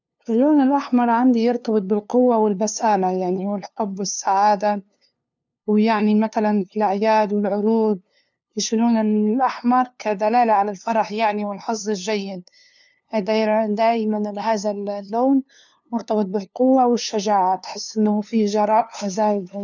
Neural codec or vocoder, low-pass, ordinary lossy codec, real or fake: codec, 16 kHz, 2 kbps, FunCodec, trained on LibriTTS, 25 frames a second; 7.2 kHz; none; fake